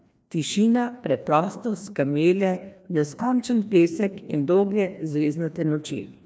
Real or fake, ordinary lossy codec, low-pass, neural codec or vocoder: fake; none; none; codec, 16 kHz, 1 kbps, FreqCodec, larger model